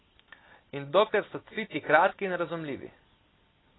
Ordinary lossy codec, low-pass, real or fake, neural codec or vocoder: AAC, 16 kbps; 7.2 kHz; real; none